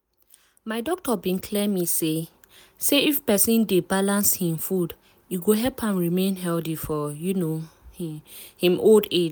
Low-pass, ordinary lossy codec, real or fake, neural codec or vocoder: none; none; real; none